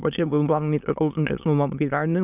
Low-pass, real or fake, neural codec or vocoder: 3.6 kHz; fake; autoencoder, 22.05 kHz, a latent of 192 numbers a frame, VITS, trained on many speakers